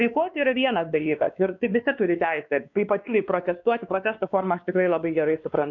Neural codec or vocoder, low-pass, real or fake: codec, 16 kHz, 2 kbps, X-Codec, WavLM features, trained on Multilingual LibriSpeech; 7.2 kHz; fake